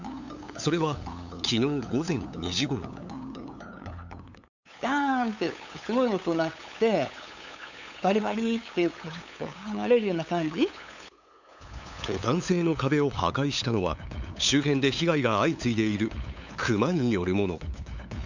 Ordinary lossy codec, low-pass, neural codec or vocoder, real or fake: none; 7.2 kHz; codec, 16 kHz, 8 kbps, FunCodec, trained on LibriTTS, 25 frames a second; fake